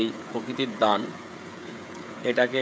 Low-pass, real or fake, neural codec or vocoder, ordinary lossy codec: none; fake; codec, 16 kHz, 16 kbps, FreqCodec, smaller model; none